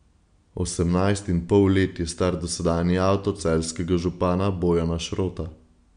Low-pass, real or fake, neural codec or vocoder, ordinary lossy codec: 9.9 kHz; real; none; none